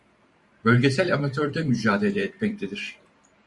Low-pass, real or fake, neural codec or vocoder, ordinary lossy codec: 10.8 kHz; fake; vocoder, 44.1 kHz, 128 mel bands every 512 samples, BigVGAN v2; Opus, 64 kbps